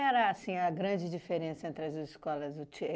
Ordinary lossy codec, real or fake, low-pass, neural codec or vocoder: none; real; none; none